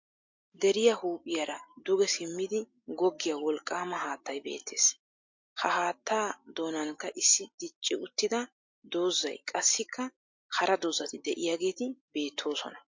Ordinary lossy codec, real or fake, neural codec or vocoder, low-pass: MP3, 48 kbps; real; none; 7.2 kHz